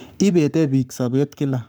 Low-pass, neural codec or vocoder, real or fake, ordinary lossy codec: none; codec, 44.1 kHz, 7.8 kbps, Pupu-Codec; fake; none